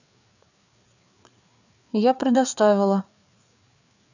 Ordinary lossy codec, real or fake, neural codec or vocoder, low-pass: none; fake; codec, 16 kHz, 4 kbps, FreqCodec, larger model; 7.2 kHz